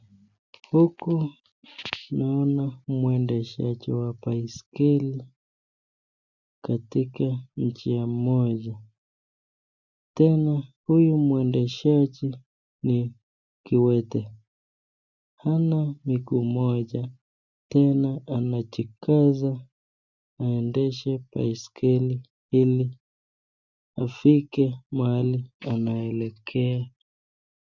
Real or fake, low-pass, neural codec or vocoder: real; 7.2 kHz; none